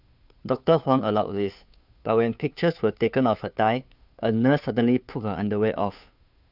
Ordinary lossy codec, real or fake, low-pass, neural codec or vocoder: none; fake; 5.4 kHz; codec, 16 kHz, 2 kbps, FunCodec, trained on Chinese and English, 25 frames a second